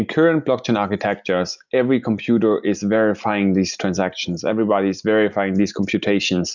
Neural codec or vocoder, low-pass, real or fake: none; 7.2 kHz; real